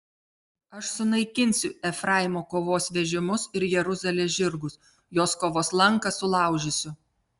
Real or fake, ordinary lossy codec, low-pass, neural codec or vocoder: real; Opus, 64 kbps; 10.8 kHz; none